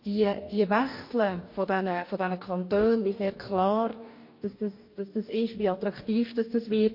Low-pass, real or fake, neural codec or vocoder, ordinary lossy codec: 5.4 kHz; fake; codec, 44.1 kHz, 2.6 kbps, DAC; MP3, 32 kbps